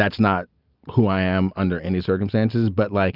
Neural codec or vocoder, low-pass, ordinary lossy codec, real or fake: none; 5.4 kHz; Opus, 24 kbps; real